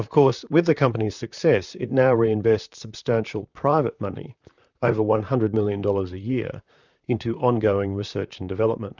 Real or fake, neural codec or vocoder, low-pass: fake; vocoder, 44.1 kHz, 128 mel bands, Pupu-Vocoder; 7.2 kHz